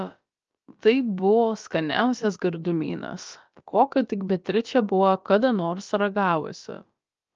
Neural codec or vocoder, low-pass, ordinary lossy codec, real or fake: codec, 16 kHz, about 1 kbps, DyCAST, with the encoder's durations; 7.2 kHz; Opus, 24 kbps; fake